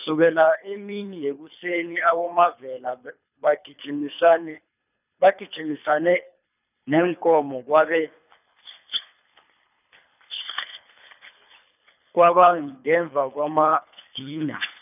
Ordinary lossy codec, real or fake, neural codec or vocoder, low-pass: none; fake; codec, 24 kHz, 3 kbps, HILCodec; 3.6 kHz